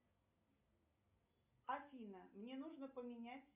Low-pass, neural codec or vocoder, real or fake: 3.6 kHz; none; real